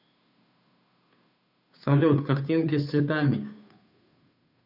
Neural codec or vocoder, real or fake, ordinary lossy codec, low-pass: codec, 16 kHz, 2 kbps, FunCodec, trained on Chinese and English, 25 frames a second; fake; none; 5.4 kHz